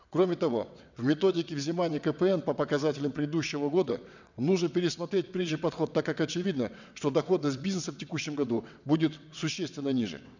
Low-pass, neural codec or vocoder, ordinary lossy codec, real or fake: 7.2 kHz; vocoder, 22.05 kHz, 80 mel bands, WaveNeXt; none; fake